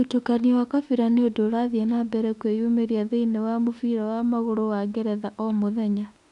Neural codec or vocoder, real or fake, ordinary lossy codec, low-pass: autoencoder, 48 kHz, 32 numbers a frame, DAC-VAE, trained on Japanese speech; fake; none; 10.8 kHz